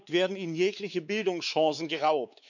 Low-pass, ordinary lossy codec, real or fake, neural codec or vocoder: 7.2 kHz; none; fake; codec, 16 kHz, 4 kbps, X-Codec, WavLM features, trained on Multilingual LibriSpeech